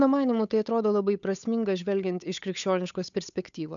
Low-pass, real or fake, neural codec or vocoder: 7.2 kHz; fake; codec, 16 kHz, 8 kbps, FunCodec, trained on Chinese and English, 25 frames a second